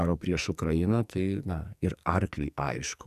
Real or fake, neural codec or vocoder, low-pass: fake; codec, 44.1 kHz, 2.6 kbps, SNAC; 14.4 kHz